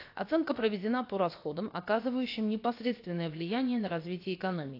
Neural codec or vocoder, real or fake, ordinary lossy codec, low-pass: codec, 16 kHz, about 1 kbps, DyCAST, with the encoder's durations; fake; AAC, 32 kbps; 5.4 kHz